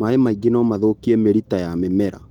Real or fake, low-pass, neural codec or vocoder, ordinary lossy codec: real; 19.8 kHz; none; Opus, 24 kbps